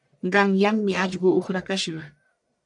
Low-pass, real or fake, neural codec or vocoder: 10.8 kHz; fake; codec, 44.1 kHz, 1.7 kbps, Pupu-Codec